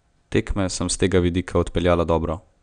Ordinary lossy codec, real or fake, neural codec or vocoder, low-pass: none; real; none; 9.9 kHz